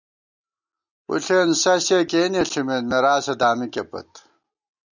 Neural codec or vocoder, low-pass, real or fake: none; 7.2 kHz; real